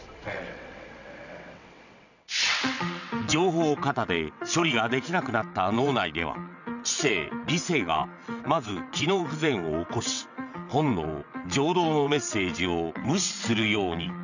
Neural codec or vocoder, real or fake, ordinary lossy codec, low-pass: vocoder, 22.05 kHz, 80 mel bands, WaveNeXt; fake; none; 7.2 kHz